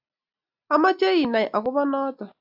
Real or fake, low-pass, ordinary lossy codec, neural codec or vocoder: real; 5.4 kHz; AAC, 48 kbps; none